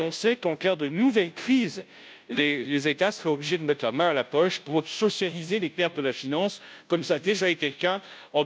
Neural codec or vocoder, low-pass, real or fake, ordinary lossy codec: codec, 16 kHz, 0.5 kbps, FunCodec, trained on Chinese and English, 25 frames a second; none; fake; none